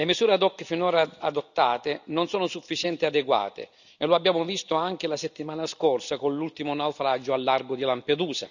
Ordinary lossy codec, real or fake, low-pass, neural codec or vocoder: none; real; 7.2 kHz; none